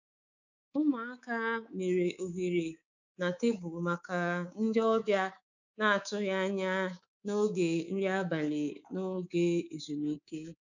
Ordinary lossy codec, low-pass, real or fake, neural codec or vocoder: none; 7.2 kHz; fake; codec, 24 kHz, 3.1 kbps, DualCodec